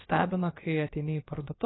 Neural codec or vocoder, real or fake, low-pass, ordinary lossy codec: codec, 16 kHz, about 1 kbps, DyCAST, with the encoder's durations; fake; 7.2 kHz; AAC, 16 kbps